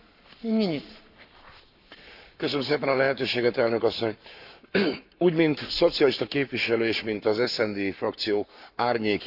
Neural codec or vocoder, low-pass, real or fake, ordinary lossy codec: codec, 44.1 kHz, 7.8 kbps, Pupu-Codec; 5.4 kHz; fake; none